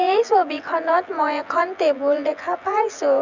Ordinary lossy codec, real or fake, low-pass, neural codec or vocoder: none; fake; 7.2 kHz; vocoder, 24 kHz, 100 mel bands, Vocos